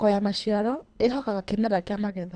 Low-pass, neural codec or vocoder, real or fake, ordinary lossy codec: 9.9 kHz; codec, 24 kHz, 3 kbps, HILCodec; fake; none